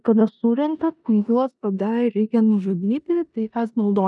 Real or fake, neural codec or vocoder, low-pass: fake; codec, 16 kHz in and 24 kHz out, 0.9 kbps, LongCat-Audio-Codec, four codebook decoder; 10.8 kHz